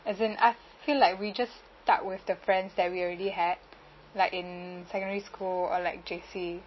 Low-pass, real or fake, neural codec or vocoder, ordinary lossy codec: 7.2 kHz; real; none; MP3, 24 kbps